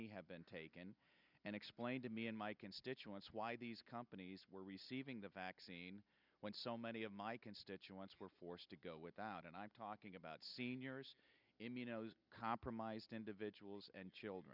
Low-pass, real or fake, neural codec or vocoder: 5.4 kHz; real; none